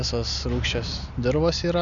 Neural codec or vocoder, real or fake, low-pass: none; real; 7.2 kHz